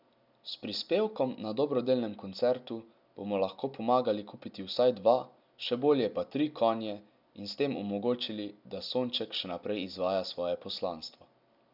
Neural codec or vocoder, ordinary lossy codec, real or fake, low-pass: none; none; real; 5.4 kHz